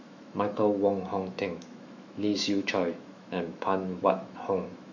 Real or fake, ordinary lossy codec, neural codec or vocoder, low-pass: fake; none; autoencoder, 48 kHz, 128 numbers a frame, DAC-VAE, trained on Japanese speech; 7.2 kHz